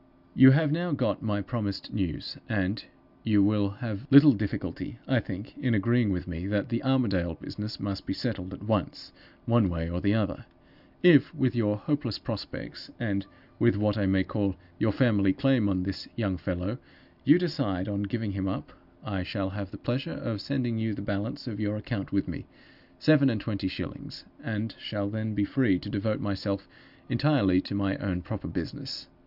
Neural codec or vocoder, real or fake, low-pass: none; real; 5.4 kHz